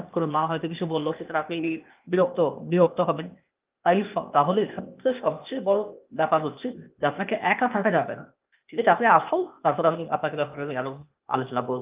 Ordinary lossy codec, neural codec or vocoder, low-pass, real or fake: Opus, 24 kbps; codec, 16 kHz, 0.8 kbps, ZipCodec; 3.6 kHz; fake